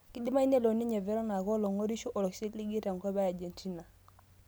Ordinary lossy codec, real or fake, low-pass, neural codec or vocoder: none; real; none; none